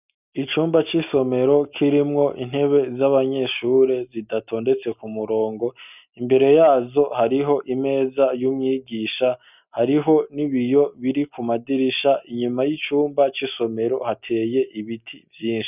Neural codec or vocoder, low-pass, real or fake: none; 3.6 kHz; real